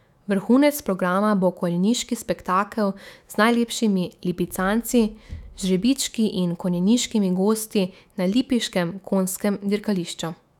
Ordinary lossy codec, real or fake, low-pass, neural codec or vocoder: none; fake; 19.8 kHz; autoencoder, 48 kHz, 128 numbers a frame, DAC-VAE, trained on Japanese speech